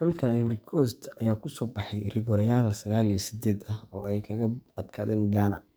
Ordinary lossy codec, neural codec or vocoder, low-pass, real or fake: none; codec, 44.1 kHz, 2.6 kbps, SNAC; none; fake